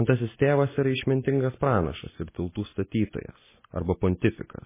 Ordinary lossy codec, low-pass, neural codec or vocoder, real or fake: MP3, 16 kbps; 3.6 kHz; none; real